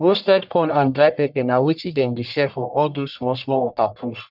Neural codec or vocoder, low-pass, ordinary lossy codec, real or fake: codec, 44.1 kHz, 1.7 kbps, Pupu-Codec; 5.4 kHz; none; fake